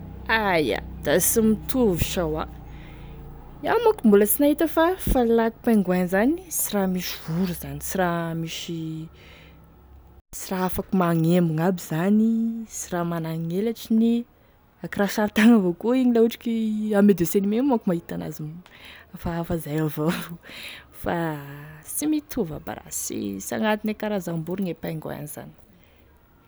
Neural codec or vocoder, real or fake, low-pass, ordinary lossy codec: none; real; none; none